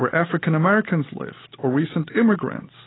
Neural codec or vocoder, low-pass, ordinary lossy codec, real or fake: none; 7.2 kHz; AAC, 16 kbps; real